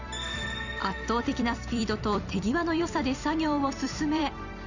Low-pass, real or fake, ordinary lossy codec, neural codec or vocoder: 7.2 kHz; fake; none; vocoder, 44.1 kHz, 128 mel bands every 256 samples, BigVGAN v2